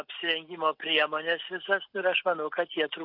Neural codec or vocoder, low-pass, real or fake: none; 5.4 kHz; real